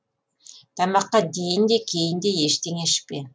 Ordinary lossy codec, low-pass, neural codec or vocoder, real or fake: none; none; none; real